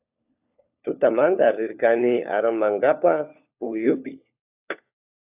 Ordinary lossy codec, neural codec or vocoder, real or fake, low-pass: AAC, 32 kbps; codec, 16 kHz, 4 kbps, FunCodec, trained on LibriTTS, 50 frames a second; fake; 3.6 kHz